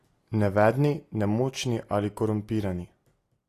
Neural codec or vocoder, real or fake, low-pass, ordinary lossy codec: none; real; 14.4 kHz; AAC, 48 kbps